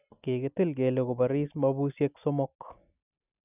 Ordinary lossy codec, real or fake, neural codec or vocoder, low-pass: none; real; none; 3.6 kHz